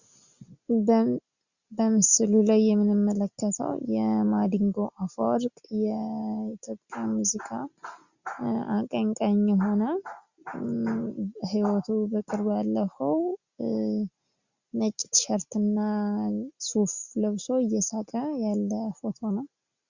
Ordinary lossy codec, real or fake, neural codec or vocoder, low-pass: Opus, 64 kbps; real; none; 7.2 kHz